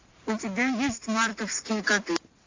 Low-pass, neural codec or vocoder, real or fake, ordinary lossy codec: 7.2 kHz; none; real; AAC, 48 kbps